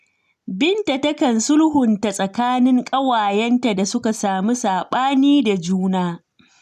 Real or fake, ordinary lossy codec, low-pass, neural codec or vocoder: real; none; 14.4 kHz; none